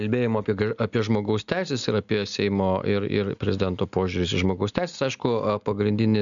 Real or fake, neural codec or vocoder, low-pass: real; none; 7.2 kHz